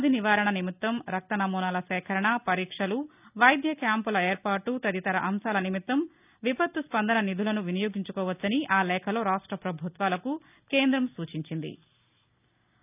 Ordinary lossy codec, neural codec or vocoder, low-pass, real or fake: none; none; 3.6 kHz; real